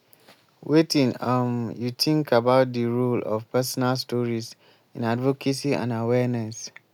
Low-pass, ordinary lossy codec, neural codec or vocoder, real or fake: 19.8 kHz; none; none; real